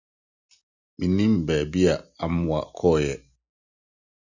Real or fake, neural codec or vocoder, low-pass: real; none; 7.2 kHz